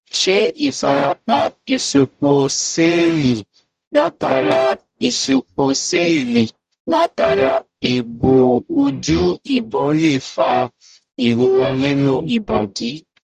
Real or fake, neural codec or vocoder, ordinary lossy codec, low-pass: fake; codec, 44.1 kHz, 0.9 kbps, DAC; Opus, 64 kbps; 14.4 kHz